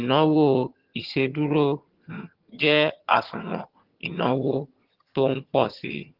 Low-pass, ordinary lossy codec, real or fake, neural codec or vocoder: 5.4 kHz; Opus, 16 kbps; fake; vocoder, 22.05 kHz, 80 mel bands, HiFi-GAN